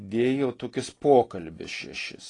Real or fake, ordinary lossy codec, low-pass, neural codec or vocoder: real; AAC, 32 kbps; 10.8 kHz; none